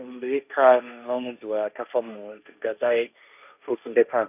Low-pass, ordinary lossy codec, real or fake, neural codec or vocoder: 3.6 kHz; none; fake; codec, 16 kHz, 1.1 kbps, Voila-Tokenizer